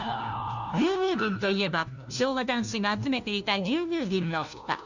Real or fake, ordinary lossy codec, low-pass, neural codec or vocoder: fake; none; 7.2 kHz; codec, 16 kHz, 1 kbps, FunCodec, trained on LibriTTS, 50 frames a second